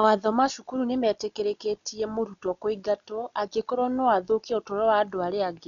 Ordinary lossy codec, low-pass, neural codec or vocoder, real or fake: none; 7.2 kHz; none; real